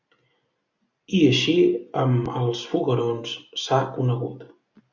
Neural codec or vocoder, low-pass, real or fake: none; 7.2 kHz; real